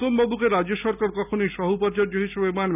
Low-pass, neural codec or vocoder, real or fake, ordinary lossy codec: 3.6 kHz; none; real; none